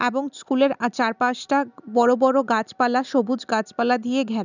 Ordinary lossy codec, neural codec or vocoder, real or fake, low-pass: none; none; real; 7.2 kHz